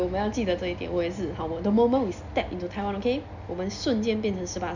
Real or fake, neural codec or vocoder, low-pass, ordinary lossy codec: real; none; 7.2 kHz; none